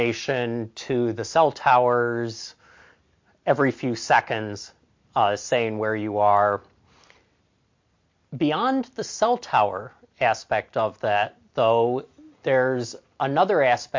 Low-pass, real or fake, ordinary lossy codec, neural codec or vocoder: 7.2 kHz; real; MP3, 48 kbps; none